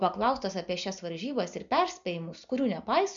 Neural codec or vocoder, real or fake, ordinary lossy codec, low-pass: none; real; MP3, 96 kbps; 7.2 kHz